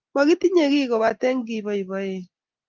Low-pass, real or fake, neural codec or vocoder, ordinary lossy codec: 7.2 kHz; real; none; Opus, 24 kbps